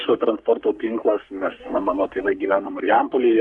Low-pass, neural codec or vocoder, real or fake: 10.8 kHz; codec, 44.1 kHz, 3.4 kbps, Pupu-Codec; fake